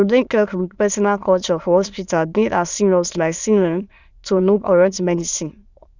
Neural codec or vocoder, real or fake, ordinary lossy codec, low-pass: autoencoder, 22.05 kHz, a latent of 192 numbers a frame, VITS, trained on many speakers; fake; Opus, 64 kbps; 7.2 kHz